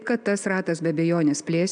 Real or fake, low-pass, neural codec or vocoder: real; 9.9 kHz; none